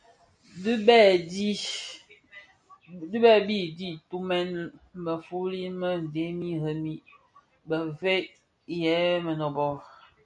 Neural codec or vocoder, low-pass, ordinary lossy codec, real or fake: none; 9.9 kHz; MP3, 96 kbps; real